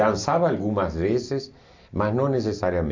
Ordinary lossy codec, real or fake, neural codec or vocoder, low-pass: MP3, 48 kbps; real; none; 7.2 kHz